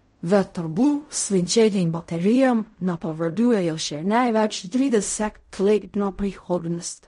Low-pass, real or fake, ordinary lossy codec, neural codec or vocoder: 10.8 kHz; fake; MP3, 48 kbps; codec, 16 kHz in and 24 kHz out, 0.4 kbps, LongCat-Audio-Codec, fine tuned four codebook decoder